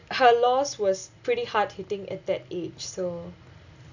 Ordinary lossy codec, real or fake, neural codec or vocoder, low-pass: none; real; none; 7.2 kHz